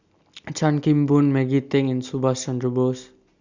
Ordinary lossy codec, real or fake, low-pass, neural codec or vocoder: Opus, 64 kbps; real; 7.2 kHz; none